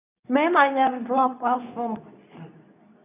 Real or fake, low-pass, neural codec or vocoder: fake; 3.6 kHz; codec, 24 kHz, 0.9 kbps, WavTokenizer, medium speech release version 2